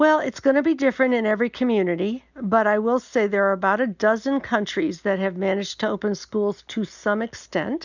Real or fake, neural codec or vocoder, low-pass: real; none; 7.2 kHz